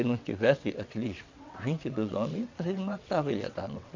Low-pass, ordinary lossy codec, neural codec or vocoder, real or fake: 7.2 kHz; MP3, 64 kbps; vocoder, 22.05 kHz, 80 mel bands, WaveNeXt; fake